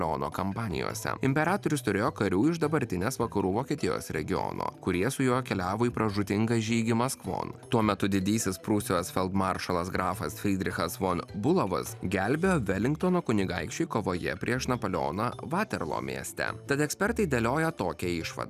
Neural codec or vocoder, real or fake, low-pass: none; real; 14.4 kHz